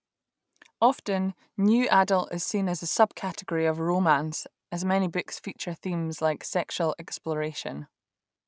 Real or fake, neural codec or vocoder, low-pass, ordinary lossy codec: real; none; none; none